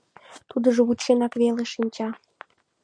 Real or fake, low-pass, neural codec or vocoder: real; 9.9 kHz; none